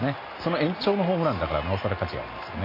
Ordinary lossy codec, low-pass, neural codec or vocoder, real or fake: MP3, 24 kbps; 5.4 kHz; none; real